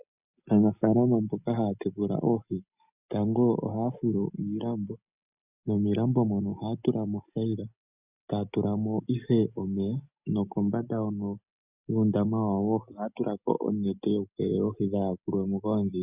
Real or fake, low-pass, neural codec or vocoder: real; 3.6 kHz; none